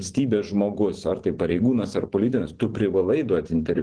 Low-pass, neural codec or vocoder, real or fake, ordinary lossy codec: 9.9 kHz; vocoder, 24 kHz, 100 mel bands, Vocos; fake; Opus, 16 kbps